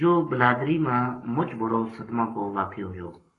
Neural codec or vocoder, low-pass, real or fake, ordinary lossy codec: codec, 44.1 kHz, 7.8 kbps, Pupu-Codec; 10.8 kHz; fake; AAC, 32 kbps